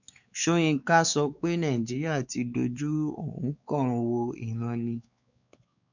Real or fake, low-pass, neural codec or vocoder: fake; 7.2 kHz; codec, 16 kHz, 4 kbps, X-Codec, WavLM features, trained on Multilingual LibriSpeech